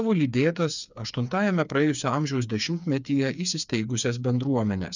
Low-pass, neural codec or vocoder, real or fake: 7.2 kHz; codec, 16 kHz, 4 kbps, FreqCodec, smaller model; fake